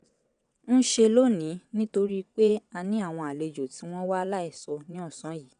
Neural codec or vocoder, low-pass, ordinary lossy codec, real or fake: vocoder, 22.05 kHz, 80 mel bands, WaveNeXt; 9.9 kHz; none; fake